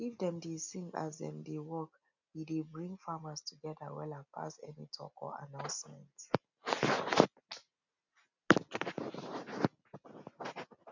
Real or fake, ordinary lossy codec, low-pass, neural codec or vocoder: real; none; 7.2 kHz; none